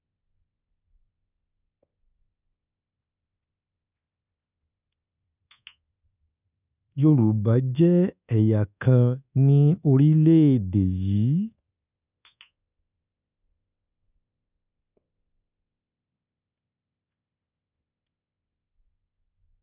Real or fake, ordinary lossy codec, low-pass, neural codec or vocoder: fake; none; 3.6 kHz; codec, 24 kHz, 1.2 kbps, DualCodec